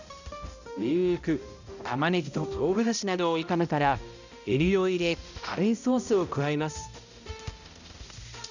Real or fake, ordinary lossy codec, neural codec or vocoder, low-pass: fake; none; codec, 16 kHz, 0.5 kbps, X-Codec, HuBERT features, trained on balanced general audio; 7.2 kHz